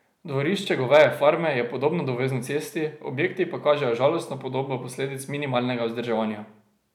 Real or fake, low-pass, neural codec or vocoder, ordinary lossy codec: real; 19.8 kHz; none; none